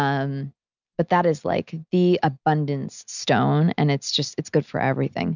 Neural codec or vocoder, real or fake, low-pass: none; real; 7.2 kHz